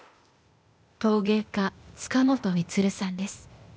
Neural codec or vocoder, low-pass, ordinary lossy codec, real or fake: codec, 16 kHz, 0.8 kbps, ZipCodec; none; none; fake